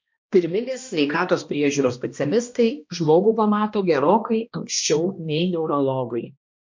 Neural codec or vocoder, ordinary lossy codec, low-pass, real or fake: codec, 16 kHz, 1 kbps, X-Codec, HuBERT features, trained on balanced general audio; MP3, 48 kbps; 7.2 kHz; fake